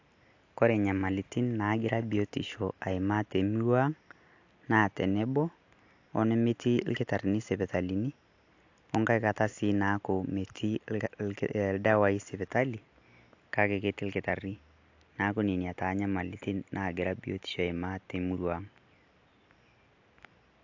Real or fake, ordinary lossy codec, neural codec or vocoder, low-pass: real; AAC, 48 kbps; none; 7.2 kHz